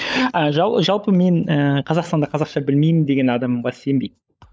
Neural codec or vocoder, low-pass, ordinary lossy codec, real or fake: codec, 16 kHz, 16 kbps, FunCodec, trained on LibriTTS, 50 frames a second; none; none; fake